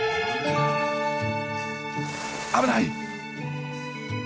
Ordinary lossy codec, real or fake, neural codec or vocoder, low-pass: none; real; none; none